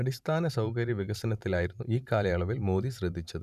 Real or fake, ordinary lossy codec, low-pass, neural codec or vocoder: fake; none; 14.4 kHz; vocoder, 44.1 kHz, 128 mel bands every 512 samples, BigVGAN v2